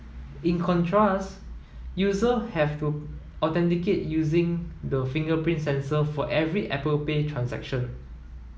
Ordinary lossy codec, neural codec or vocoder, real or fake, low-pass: none; none; real; none